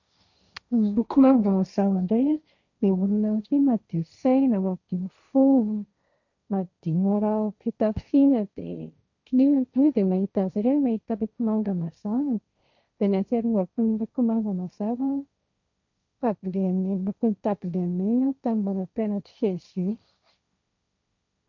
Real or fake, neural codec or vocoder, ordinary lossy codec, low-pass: fake; codec, 16 kHz, 1.1 kbps, Voila-Tokenizer; none; 7.2 kHz